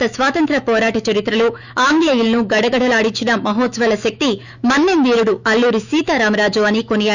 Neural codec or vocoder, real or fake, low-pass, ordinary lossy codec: autoencoder, 48 kHz, 128 numbers a frame, DAC-VAE, trained on Japanese speech; fake; 7.2 kHz; none